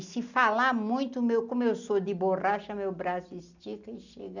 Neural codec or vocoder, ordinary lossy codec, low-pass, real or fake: none; none; 7.2 kHz; real